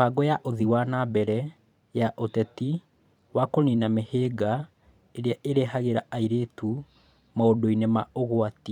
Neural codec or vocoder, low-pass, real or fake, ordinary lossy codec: vocoder, 44.1 kHz, 128 mel bands every 512 samples, BigVGAN v2; 19.8 kHz; fake; none